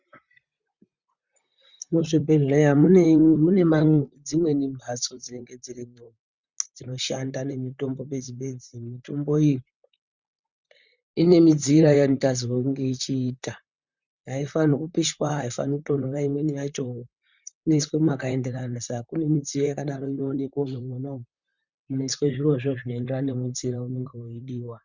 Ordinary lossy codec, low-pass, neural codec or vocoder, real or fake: Opus, 64 kbps; 7.2 kHz; vocoder, 44.1 kHz, 128 mel bands, Pupu-Vocoder; fake